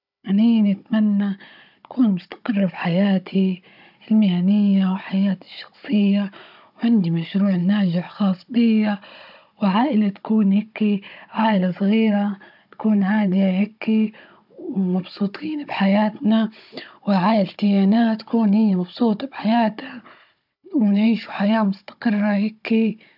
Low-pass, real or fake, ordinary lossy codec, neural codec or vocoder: 5.4 kHz; fake; none; codec, 16 kHz, 16 kbps, FunCodec, trained on Chinese and English, 50 frames a second